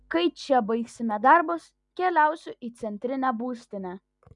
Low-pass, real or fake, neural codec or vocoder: 10.8 kHz; real; none